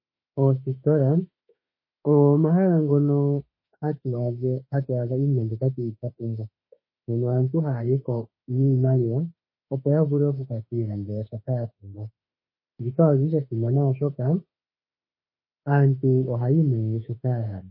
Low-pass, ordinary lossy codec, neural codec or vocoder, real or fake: 5.4 kHz; MP3, 24 kbps; autoencoder, 48 kHz, 32 numbers a frame, DAC-VAE, trained on Japanese speech; fake